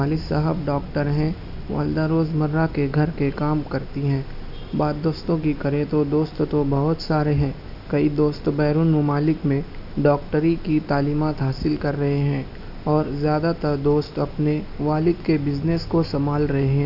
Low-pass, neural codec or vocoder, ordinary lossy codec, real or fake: 5.4 kHz; none; none; real